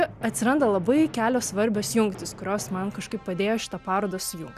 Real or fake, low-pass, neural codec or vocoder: real; 14.4 kHz; none